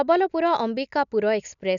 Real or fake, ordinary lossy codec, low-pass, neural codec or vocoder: real; none; 7.2 kHz; none